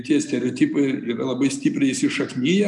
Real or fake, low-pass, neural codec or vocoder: real; 10.8 kHz; none